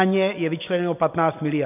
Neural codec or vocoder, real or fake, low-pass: none; real; 3.6 kHz